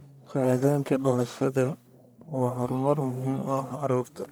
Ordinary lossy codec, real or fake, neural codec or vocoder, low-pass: none; fake; codec, 44.1 kHz, 1.7 kbps, Pupu-Codec; none